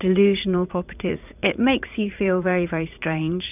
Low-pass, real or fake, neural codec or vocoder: 3.6 kHz; fake; vocoder, 44.1 kHz, 80 mel bands, Vocos